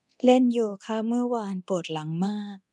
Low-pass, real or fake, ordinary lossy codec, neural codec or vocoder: none; fake; none; codec, 24 kHz, 0.9 kbps, DualCodec